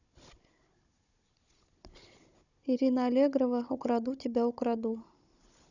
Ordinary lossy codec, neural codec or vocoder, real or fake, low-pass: none; codec, 16 kHz, 16 kbps, FunCodec, trained on Chinese and English, 50 frames a second; fake; 7.2 kHz